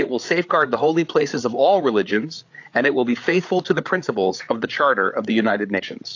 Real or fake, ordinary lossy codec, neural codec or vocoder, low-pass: fake; AAC, 48 kbps; codec, 16 kHz, 8 kbps, FreqCodec, larger model; 7.2 kHz